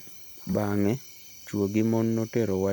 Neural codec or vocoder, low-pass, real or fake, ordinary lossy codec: vocoder, 44.1 kHz, 128 mel bands every 256 samples, BigVGAN v2; none; fake; none